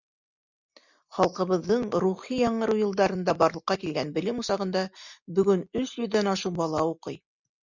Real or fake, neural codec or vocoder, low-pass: real; none; 7.2 kHz